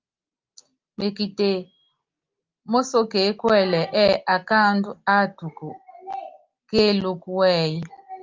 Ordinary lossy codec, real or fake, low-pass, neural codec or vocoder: Opus, 32 kbps; real; 7.2 kHz; none